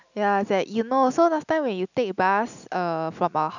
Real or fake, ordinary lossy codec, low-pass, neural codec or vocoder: real; none; 7.2 kHz; none